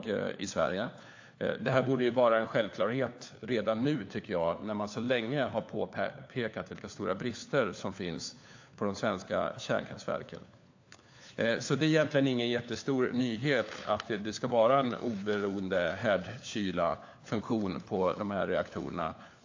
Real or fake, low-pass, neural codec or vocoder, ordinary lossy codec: fake; 7.2 kHz; codec, 16 kHz, 4 kbps, FunCodec, trained on LibriTTS, 50 frames a second; AAC, 48 kbps